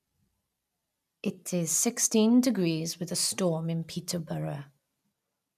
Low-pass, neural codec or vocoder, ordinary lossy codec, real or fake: 14.4 kHz; none; none; real